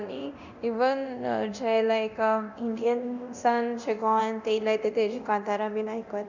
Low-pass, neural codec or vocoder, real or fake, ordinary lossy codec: 7.2 kHz; codec, 24 kHz, 0.9 kbps, DualCodec; fake; none